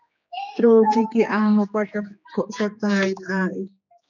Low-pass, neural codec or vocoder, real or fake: 7.2 kHz; codec, 16 kHz, 2 kbps, X-Codec, HuBERT features, trained on general audio; fake